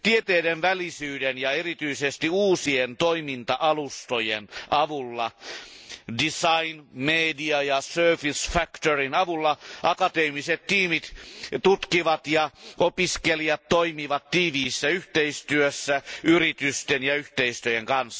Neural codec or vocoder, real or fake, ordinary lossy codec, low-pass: none; real; none; none